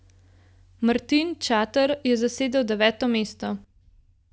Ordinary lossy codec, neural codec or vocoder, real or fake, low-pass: none; none; real; none